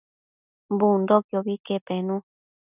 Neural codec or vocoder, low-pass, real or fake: none; 3.6 kHz; real